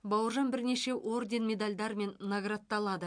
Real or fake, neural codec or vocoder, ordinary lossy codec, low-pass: real; none; MP3, 64 kbps; 9.9 kHz